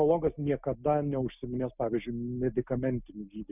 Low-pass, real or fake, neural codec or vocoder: 3.6 kHz; real; none